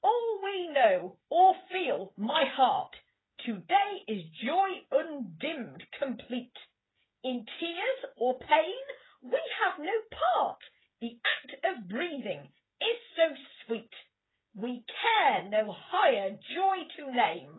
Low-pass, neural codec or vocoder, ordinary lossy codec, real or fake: 7.2 kHz; codec, 16 kHz, 8 kbps, FreqCodec, smaller model; AAC, 16 kbps; fake